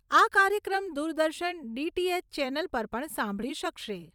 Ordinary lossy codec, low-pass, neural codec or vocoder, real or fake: none; 19.8 kHz; vocoder, 44.1 kHz, 128 mel bands every 256 samples, BigVGAN v2; fake